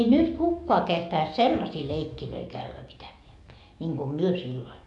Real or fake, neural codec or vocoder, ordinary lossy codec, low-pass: fake; codec, 44.1 kHz, 7.8 kbps, DAC; none; 10.8 kHz